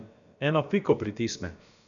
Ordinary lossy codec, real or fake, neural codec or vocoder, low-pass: none; fake; codec, 16 kHz, about 1 kbps, DyCAST, with the encoder's durations; 7.2 kHz